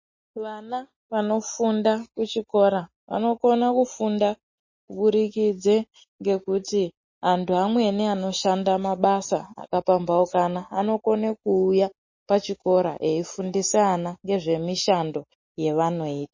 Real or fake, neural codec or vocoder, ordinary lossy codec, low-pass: real; none; MP3, 32 kbps; 7.2 kHz